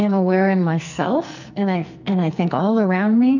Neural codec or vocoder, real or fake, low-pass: codec, 44.1 kHz, 2.6 kbps, SNAC; fake; 7.2 kHz